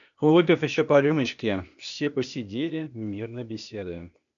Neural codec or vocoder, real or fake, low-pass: codec, 16 kHz, 0.8 kbps, ZipCodec; fake; 7.2 kHz